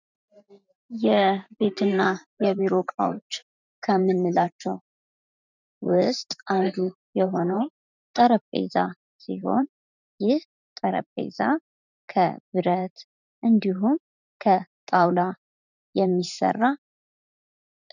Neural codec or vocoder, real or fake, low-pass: vocoder, 44.1 kHz, 128 mel bands every 512 samples, BigVGAN v2; fake; 7.2 kHz